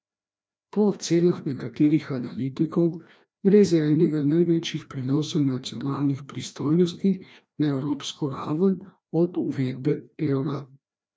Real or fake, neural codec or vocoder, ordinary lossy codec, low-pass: fake; codec, 16 kHz, 1 kbps, FreqCodec, larger model; none; none